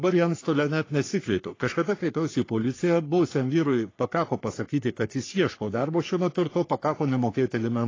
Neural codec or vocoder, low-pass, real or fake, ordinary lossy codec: codec, 44.1 kHz, 3.4 kbps, Pupu-Codec; 7.2 kHz; fake; AAC, 32 kbps